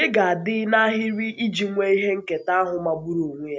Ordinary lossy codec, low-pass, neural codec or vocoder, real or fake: none; none; none; real